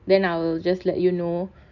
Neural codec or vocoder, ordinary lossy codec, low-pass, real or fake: none; none; 7.2 kHz; real